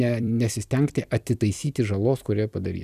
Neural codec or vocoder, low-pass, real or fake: vocoder, 44.1 kHz, 128 mel bands every 256 samples, BigVGAN v2; 14.4 kHz; fake